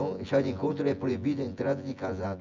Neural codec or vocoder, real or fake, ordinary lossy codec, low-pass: vocoder, 24 kHz, 100 mel bands, Vocos; fake; MP3, 64 kbps; 7.2 kHz